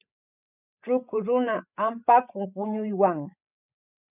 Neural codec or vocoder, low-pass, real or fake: codec, 16 kHz, 8 kbps, FreqCodec, larger model; 3.6 kHz; fake